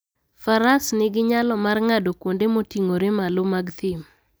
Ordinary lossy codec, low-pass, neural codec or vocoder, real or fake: none; none; none; real